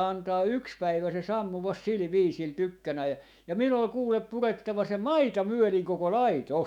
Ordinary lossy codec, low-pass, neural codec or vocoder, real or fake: none; 19.8 kHz; autoencoder, 48 kHz, 128 numbers a frame, DAC-VAE, trained on Japanese speech; fake